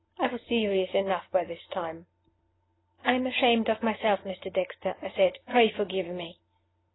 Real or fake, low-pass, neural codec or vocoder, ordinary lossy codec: real; 7.2 kHz; none; AAC, 16 kbps